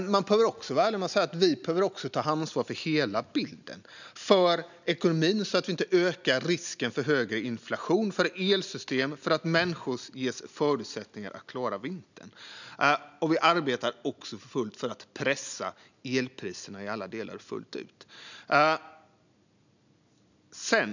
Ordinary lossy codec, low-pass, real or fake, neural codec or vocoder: none; 7.2 kHz; real; none